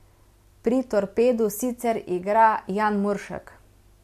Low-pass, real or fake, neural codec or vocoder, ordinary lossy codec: 14.4 kHz; real; none; MP3, 64 kbps